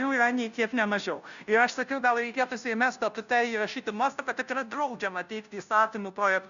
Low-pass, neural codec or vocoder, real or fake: 7.2 kHz; codec, 16 kHz, 0.5 kbps, FunCodec, trained on Chinese and English, 25 frames a second; fake